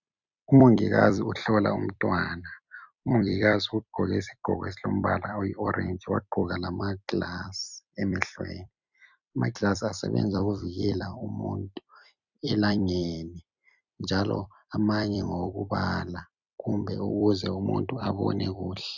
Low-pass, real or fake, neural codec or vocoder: 7.2 kHz; fake; vocoder, 44.1 kHz, 128 mel bands every 256 samples, BigVGAN v2